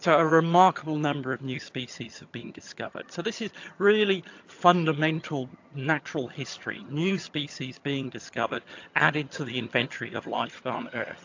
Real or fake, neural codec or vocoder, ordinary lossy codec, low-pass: fake; vocoder, 22.05 kHz, 80 mel bands, HiFi-GAN; AAC, 48 kbps; 7.2 kHz